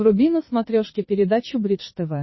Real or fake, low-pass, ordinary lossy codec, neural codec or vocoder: fake; 7.2 kHz; MP3, 24 kbps; vocoder, 22.05 kHz, 80 mel bands, Vocos